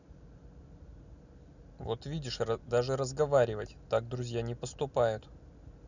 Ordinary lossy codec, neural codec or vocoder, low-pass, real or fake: none; none; 7.2 kHz; real